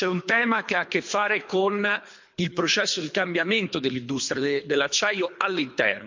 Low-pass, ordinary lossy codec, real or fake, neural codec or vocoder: 7.2 kHz; MP3, 48 kbps; fake; codec, 24 kHz, 3 kbps, HILCodec